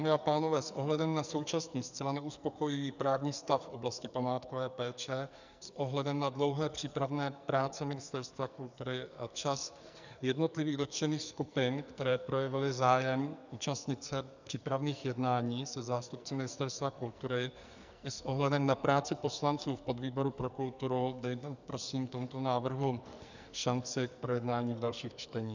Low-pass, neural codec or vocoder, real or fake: 7.2 kHz; codec, 44.1 kHz, 2.6 kbps, SNAC; fake